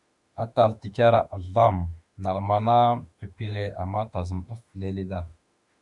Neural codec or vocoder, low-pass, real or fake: autoencoder, 48 kHz, 32 numbers a frame, DAC-VAE, trained on Japanese speech; 10.8 kHz; fake